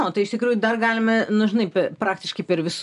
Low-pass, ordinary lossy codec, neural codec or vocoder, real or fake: 10.8 kHz; MP3, 96 kbps; none; real